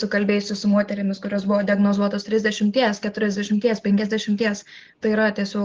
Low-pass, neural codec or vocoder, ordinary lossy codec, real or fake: 7.2 kHz; none; Opus, 16 kbps; real